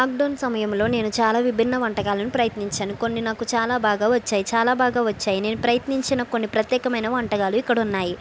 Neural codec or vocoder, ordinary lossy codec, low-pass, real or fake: none; none; none; real